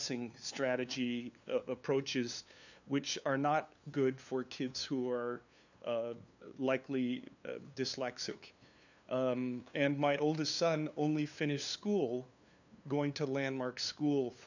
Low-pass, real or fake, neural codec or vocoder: 7.2 kHz; fake; codec, 16 kHz, 2 kbps, FunCodec, trained on LibriTTS, 25 frames a second